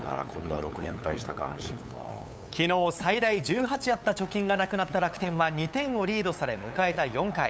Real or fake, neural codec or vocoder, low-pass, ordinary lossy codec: fake; codec, 16 kHz, 8 kbps, FunCodec, trained on LibriTTS, 25 frames a second; none; none